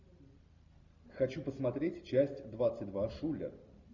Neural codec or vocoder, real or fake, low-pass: none; real; 7.2 kHz